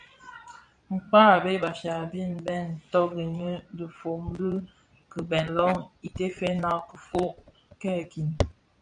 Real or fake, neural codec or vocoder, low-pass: fake; vocoder, 22.05 kHz, 80 mel bands, Vocos; 9.9 kHz